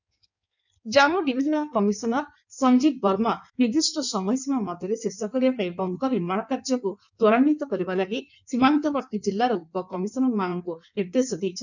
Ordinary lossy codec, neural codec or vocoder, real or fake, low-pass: none; codec, 16 kHz in and 24 kHz out, 1.1 kbps, FireRedTTS-2 codec; fake; 7.2 kHz